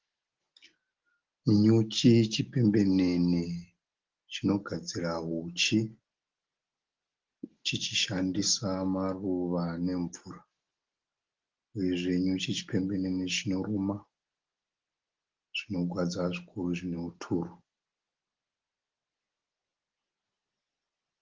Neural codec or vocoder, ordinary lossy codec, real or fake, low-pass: none; Opus, 16 kbps; real; 7.2 kHz